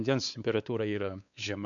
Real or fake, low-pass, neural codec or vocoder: fake; 7.2 kHz; codec, 16 kHz, 4 kbps, X-Codec, WavLM features, trained on Multilingual LibriSpeech